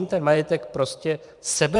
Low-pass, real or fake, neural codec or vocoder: 10.8 kHz; fake; vocoder, 44.1 kHz, 128 mel bands every 512 samples, BigVGAN v2